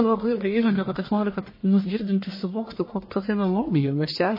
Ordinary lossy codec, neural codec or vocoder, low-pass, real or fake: MP3, 24 kbps; codec, 44.1 kHz, 1.7 kbps, Pupu-Codec; 5.4 kHz; fake